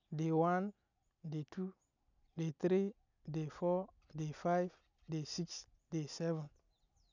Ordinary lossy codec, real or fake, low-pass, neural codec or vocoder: none; real; 7.2 kHz; none